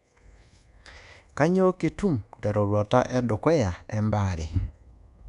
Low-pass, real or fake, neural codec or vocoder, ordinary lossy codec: 10.8 kHz; fake; codec, 24 kHz, 1.2 kbps, DualCodec; none